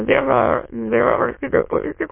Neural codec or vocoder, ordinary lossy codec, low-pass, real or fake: autoencoder, 22.05 kHz, a latent of 192 numbers a frame, VITS, trained on many speakers; MP3, 24 kbps; 3.6 kHz; fake